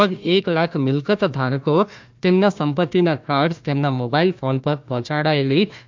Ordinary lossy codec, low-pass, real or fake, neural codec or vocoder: MP3, 64 kbps; 7.2 kHz; fake; codec, 16 kHz, 1 kbps, FunCodec, trained on Chinese and English, 50 frames a second